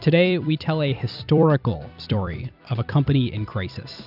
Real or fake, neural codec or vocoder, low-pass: fake; vocoder, 44.1 kHz, 128 mel bands every 256 samples, BigVGAN v2; 5.4 kHz